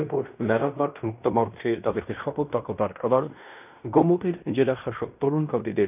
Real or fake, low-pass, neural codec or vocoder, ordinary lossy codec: fake; 3.6 kHz; codec, 16 kHz in and 24 kHz out, 0.9 kbps, LongCat-Audio-Codec, fine tuned four codebook decoder; none